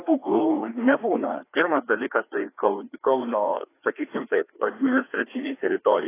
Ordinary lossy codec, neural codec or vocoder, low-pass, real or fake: AAC, 24 kbps; codec, 16 kHz, 2 kbps, FreqCodec, larger model; 3.6 kHz; fake